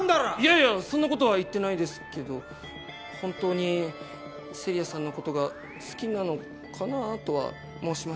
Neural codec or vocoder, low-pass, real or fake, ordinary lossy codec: none; none; real; none